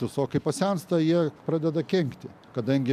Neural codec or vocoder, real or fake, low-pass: none; real; 14.4 kHz